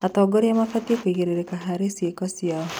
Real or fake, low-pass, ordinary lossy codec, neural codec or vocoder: real; none; none; none